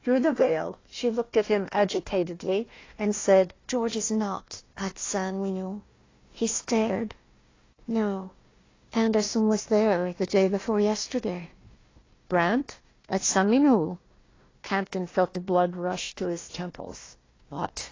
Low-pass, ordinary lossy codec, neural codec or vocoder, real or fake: 7.2 kHz; AAC, 32 kbps; codec, 16 kHz, 1 kbps, FunCodec, trained on Chinese and English, 50 frames a second; fake